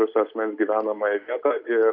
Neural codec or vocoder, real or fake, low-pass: none; real; 5.4 kHz